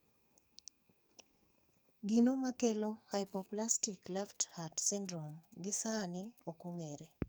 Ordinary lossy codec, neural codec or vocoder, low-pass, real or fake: none; codec, 44.1 kHz, 2.6 kbps, SNAC; none; fake